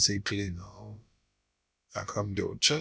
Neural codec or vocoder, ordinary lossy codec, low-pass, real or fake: codec, 16 kHz, about 1 kbps, DyCAST, with the encoder's durations; none; none; fake